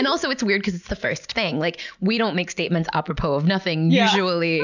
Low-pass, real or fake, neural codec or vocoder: 7.2 kHz; real; none